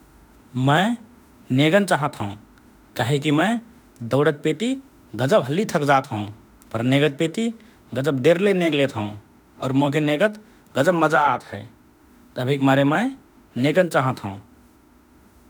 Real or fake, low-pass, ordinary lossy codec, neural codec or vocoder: fake; none; none; autoencoder, 48 kHz, 32 numbers a frame, DAC-VAE, trained on Japanese speech